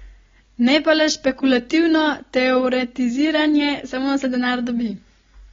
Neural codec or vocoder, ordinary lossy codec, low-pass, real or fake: none; AAC, 24 kbps; 7.2 kHz; real